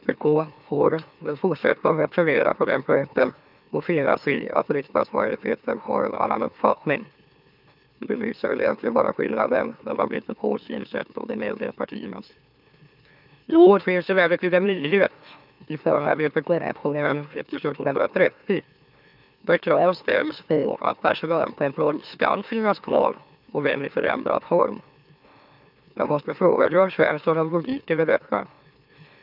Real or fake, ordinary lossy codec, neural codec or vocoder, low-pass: fake; none; autoencoder, 44.1 kHz, a latent of 192 numbers a frame, MeloTTS; 5.4 kHz